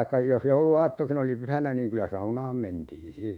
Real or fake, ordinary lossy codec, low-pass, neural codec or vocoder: fake; none; 19.8 kHz; autoencoder, 48 kHz, 32 numbers a frame, DAC-VAE, trained on Japanese speech